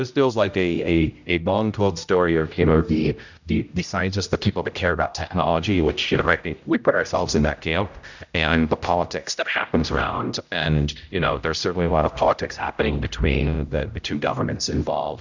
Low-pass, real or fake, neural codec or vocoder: 7.2 kHz; fake; codec, 16 kHz, 0.5 kbps, X-Codec, HuBERT features, trained on general audio